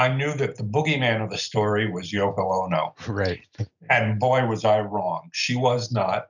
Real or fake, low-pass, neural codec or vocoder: real; 7.2 kHz; none